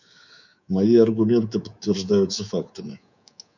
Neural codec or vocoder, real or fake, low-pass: codec, 24 kHz, 3.1 kbps, DualCodec; fake; 7.2 kHz